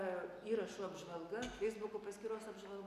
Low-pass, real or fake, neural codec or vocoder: 14.4 kHz; real; none